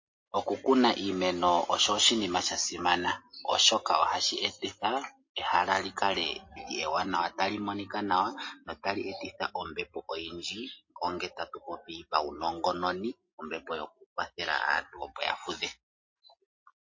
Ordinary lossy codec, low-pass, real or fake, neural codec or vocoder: MP3, 32 kbps; 7.2 kHz; real; none